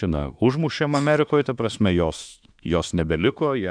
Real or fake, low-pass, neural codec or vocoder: fake; 9.9 kHz; autoencoder, 48 kHz, 32 numbers a frame, DAC-VAE, trained on Japanese speech